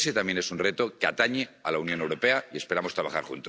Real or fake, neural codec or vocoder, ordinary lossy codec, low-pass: real; none; none; none